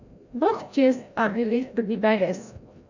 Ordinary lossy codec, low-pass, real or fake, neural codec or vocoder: none; 7.2 kHz; fake; codec, 16 kHz, 0.5 kbps, FreqCodec, larger model